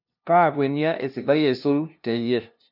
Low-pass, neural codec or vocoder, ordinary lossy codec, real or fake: 5.4 kHz; codec, 16 kHz, 0.5 kbps, FunCodec, trained on LibriTTS, 25 frames a second; none; fake